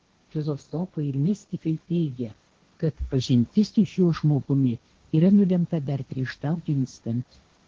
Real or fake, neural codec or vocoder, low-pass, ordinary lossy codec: fake; codec, 16 kHz, 1.1 kbps, Voila-Tokenizer; 7.2 kHz; Opus, 16 kbps